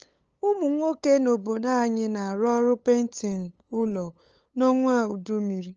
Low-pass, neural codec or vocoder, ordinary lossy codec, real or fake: 7.2 kHz; codec, 16 kHz, 8 kbps, FunCodec, trained on LibriTTS, 25 frames a second; Opus, 24 kbps; fake